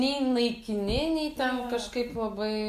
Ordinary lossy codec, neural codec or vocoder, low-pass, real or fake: Opus, 64 kbps; none; 14.4 kHz; real